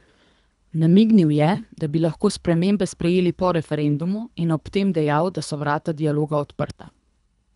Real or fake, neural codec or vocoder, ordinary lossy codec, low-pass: fake; codec, 24 kHz, 3 kbps, HILCodec; none; 10.8 kHz